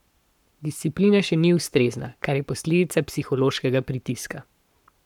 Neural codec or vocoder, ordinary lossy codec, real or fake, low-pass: codec, 44.1 kHz, 7.8 kbps, Pupu-Codec; none; fake; 19.8 kHz